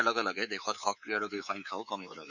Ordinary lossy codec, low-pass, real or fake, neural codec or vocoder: none; 7.2 kHz; fake; codec, 24 kHz, 3.1 kbps, DualCodec